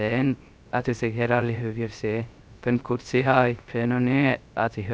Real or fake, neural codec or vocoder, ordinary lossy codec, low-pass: fake; codec, 16 kHz, 0.3 kbps, FocalCodec; none; none